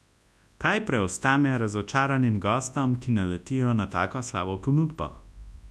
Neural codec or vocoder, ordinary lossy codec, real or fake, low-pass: codec, 24 kHz, 0.9 kbps, WavTokenizer, large speech release; none; fake; none